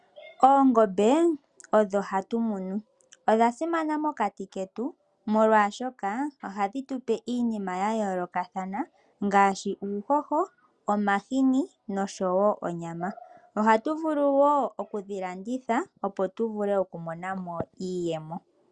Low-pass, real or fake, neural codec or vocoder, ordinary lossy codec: 9.9 kHz; real; none; Opus, 64 kbps